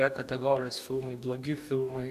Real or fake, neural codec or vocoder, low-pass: fake; codec, 44.1 kHz, 2.6 kbps, DAC; 14.4 kHz